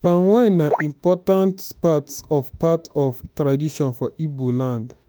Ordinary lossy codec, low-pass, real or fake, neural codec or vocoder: none; none; fake; autoencoder, 48 kHz, 32 numbers a frame, DAC-VAE, trained on Japanese speech